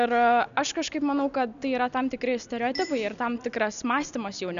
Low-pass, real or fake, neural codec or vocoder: 7.2 kHz; real; none